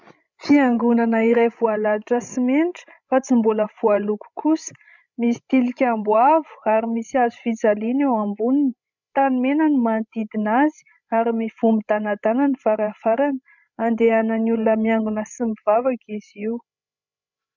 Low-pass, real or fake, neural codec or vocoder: 7.2 kHz; fake; codec, 16 kHz, 16 kbps, FreqCodec, larger model